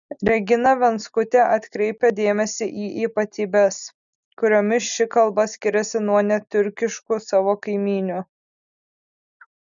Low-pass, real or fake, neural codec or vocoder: 7.2 kHz; real; none